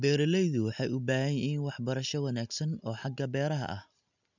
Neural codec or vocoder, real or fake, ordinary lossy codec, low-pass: none; real; none; 7.2 kHz